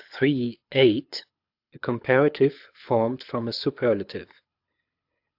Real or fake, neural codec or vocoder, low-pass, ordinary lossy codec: fake; codec, 24 kHz, 6 kbps, HILCodec; 5.4 kHz; AAC, 48 kbps